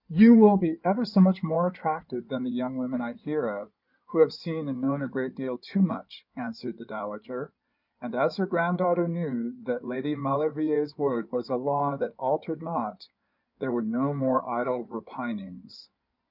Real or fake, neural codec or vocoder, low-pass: fake; codec, 16 kHz in and 24 kHz out, 2.2 kbps, FireRedTTS-2 codec; 5.4 kHz